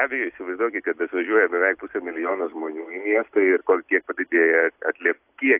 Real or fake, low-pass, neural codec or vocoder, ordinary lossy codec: real; 3.6 kHz; none; AAC, 32 kbps